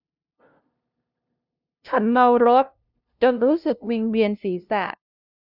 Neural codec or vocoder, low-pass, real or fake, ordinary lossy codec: codec, 16 kHz, 0.5 kbps, FunCodec, trained on LibriTTS, 25 frames a second; 5.4 kHz; fake; none